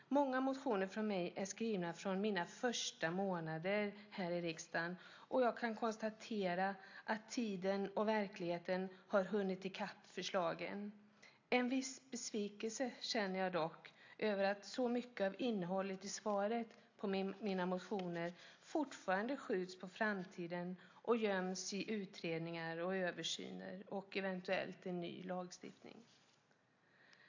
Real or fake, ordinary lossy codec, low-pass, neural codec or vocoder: real; AAC, 48 kbps; 7.2 kHz; none